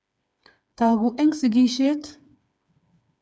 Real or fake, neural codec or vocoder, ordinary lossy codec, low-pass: fake; codec, 16 kHz, 4 kbps, FreqCodec, smaller model; none; none